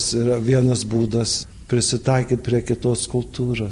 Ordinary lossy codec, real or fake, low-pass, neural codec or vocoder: MP3, 48 kbps; fake; 14.4 kHz; vocoder, 44.1 kHz, 128 mel bands every 512 samples, BigVGAN v2